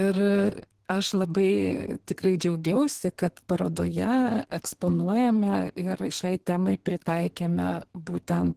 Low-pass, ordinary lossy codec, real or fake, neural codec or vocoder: 14.4 kHz; Opus, 16 kbps; fake; codec, 32 kHz, 1.9 kbps, SNAC